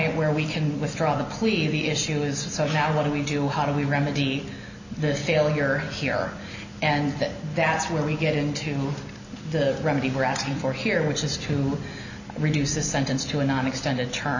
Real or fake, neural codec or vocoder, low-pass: real; none; 7.2 kHz